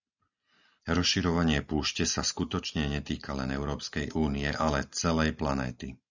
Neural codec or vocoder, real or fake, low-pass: none; real; 7.2 kHz